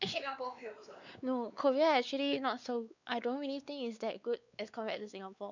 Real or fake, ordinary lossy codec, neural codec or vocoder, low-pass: fake; none; codec, 16 kHz, 4 kbps, X-Codec, WavLM features, trained on Multilingual LibriSpeech; 7.2 kHz